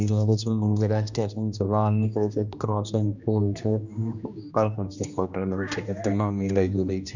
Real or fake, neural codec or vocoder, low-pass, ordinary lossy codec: fake; codec, 16 kHz, 1 kbps, X-Codec, HuBERT features, trained on general audio; 7.2 kHz; none